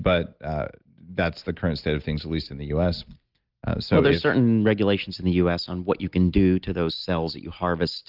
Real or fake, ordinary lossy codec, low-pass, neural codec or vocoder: real; Opus, 32 kbps; 5.4 kHz; none